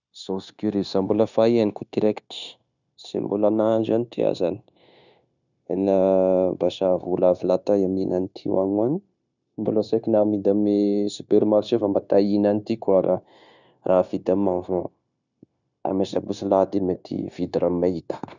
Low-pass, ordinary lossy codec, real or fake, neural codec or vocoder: 7.2 kHz; none; fake; codec, 16 kHz, 0.9 kbps, LongCat-Audio-Codec